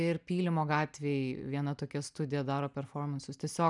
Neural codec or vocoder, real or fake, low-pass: none; real; 10.8 kHz